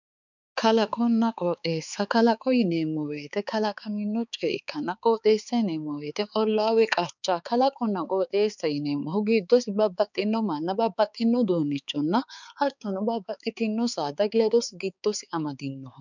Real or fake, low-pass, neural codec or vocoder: fake; 7.2 kHz; codec, 16 kHz, 4 kbps, X-Codec, HuBERT features, trained on balanced general audio